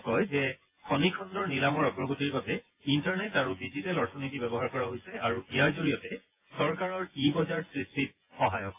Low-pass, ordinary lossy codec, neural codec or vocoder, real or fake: 3.6 kHz; AAC, 24 kbps; vocoder, 24 kHz, 100 mel bands, Vocos; fake